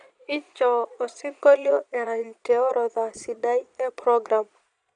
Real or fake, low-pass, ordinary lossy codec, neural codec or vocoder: fake; 9.9 kHz; none; vocoder, 22.05 kHz, 80 mel bands, Vocos